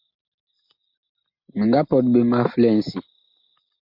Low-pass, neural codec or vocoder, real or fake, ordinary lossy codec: 5.4 kHz; none; real; MP3, 48 kbps